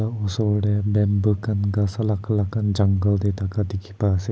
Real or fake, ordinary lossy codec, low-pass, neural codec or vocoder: real; none; none; none